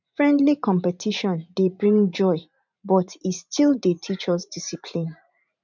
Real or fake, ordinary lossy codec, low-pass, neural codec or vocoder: fake; none; 7.2 kHz; vocoder, 44.1 kHz, 128 mel bands every 512 samples, BigVGAN v2